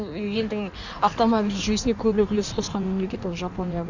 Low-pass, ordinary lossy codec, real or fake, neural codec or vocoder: 7.2 kHz; none; fake; codec, 16 kHz in and 24 kHz out, 1.1 kbps, FireRedTTS-2 codec